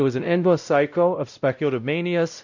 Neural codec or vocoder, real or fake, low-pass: codec, 16 kHz, 0.5 kbps, X-Codec, WavLM features, trained on Multilingual LibriSpeech; fake; 7.2 kHz